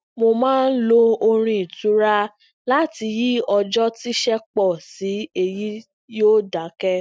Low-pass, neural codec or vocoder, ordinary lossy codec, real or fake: none; none; none; real